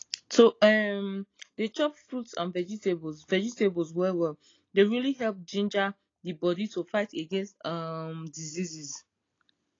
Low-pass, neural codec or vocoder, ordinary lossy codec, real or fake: 7.2 kHz; none; AAC, 32 kbps; real